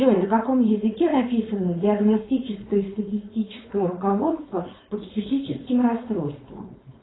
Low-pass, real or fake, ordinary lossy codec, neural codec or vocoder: 7.2 kHz; fake; AAC, 16 kbps; codec, 16 kHz, 4.8 kbps, FACodec